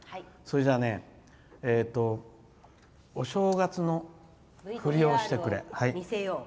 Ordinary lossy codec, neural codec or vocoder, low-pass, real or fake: none; none; none; real